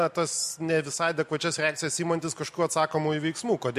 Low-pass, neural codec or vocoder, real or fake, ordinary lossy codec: 14.4 kHz; none; real; MP3, 64 kbps